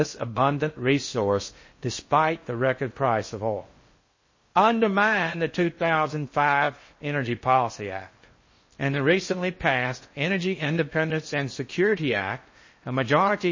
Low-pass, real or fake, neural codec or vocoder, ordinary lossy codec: 7.2 kHz; fake; codec, 16 kHz in and 24 kHz out, 0.6 kbps, FocalCodec, streaming, 4096 codes; MP3, 32 kbps